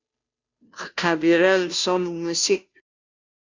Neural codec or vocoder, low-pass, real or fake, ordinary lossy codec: codec, 16 kHz, 0.5 kbps, FunCodec, trained on Chinese and English, 25 frames a second; 7.2 kHz; fake; Opus, 64 kbps